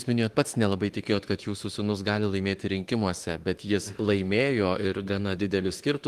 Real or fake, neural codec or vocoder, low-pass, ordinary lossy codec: fake; autoencoder, 48 kHz, 32 numbers a frame, DAC-VAE, trained on Japanese speech; 14.4 kHz; Opus, 24 kbps